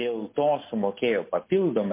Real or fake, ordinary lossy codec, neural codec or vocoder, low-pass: real; MP3, 24 kbps; none; 3.6 kHz